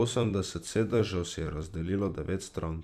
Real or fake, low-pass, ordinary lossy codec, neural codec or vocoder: fake; 14.4 kHz; none; vocoder, 44.1 kHz, 128 mel bands every 256 samples, BigVGAN v2